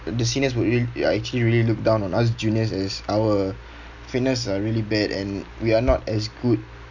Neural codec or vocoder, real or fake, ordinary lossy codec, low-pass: none; real; none; 7.2 kHz